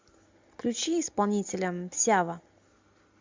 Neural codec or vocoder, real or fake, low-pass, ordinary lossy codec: none; real; 7.2 kHz; MP3, 64 kbps